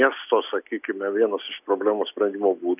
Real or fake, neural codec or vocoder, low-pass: real; none; 3.6 kHz